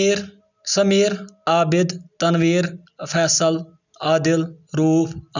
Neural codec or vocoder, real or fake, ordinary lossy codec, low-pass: none; real; none; 7.2 kHz